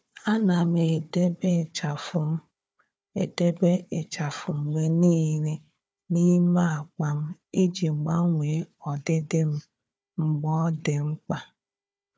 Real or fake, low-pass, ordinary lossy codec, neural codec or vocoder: fake; none; none; codec, 16 kHz, 4 kbps, FunCodec, trained on Chinese and English, 50 frames a second